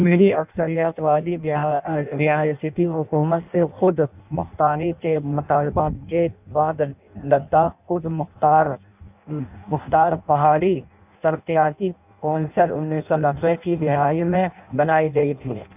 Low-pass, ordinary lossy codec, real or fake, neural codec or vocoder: 3.6 kHz; none; fake; codec, 16 kHz in and 24 kHz out, 0.6 kbps, FireRedTTS-2 codec